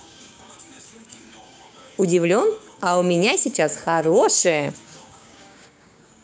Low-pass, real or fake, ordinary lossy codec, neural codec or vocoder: none; fake; none; codec, 16 kHz, 6 kbps, DAC